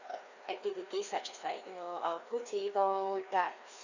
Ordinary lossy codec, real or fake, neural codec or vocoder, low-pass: none; fake; codec, 16 kHz, 2 kbps, FreqCodec, larger model; 7.2 kHz